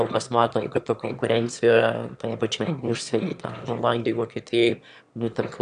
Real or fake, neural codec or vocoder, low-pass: fake; autoencoder, 22.05 kHz, a latent of 192 numbers a frame, VITS, trained on one speaker; 9.9 kHz